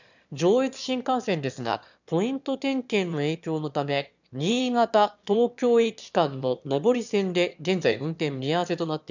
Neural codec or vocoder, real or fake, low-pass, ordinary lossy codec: autoencoder, 22.05 kHz, a latent of 192 numbers a frame, VITS, trained on one speaker; fake; 7.2 kHz; none